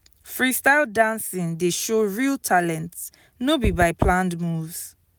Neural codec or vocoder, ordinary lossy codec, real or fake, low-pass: none; none; real; none